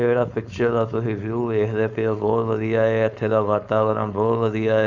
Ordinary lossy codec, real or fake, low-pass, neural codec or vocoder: Opus, 64 kbps; fake; 7.2 kHz; codec, 16 kHz, 4.8 kbps, FACodec